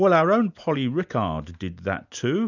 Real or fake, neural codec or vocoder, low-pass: real; none; 7.2 kHz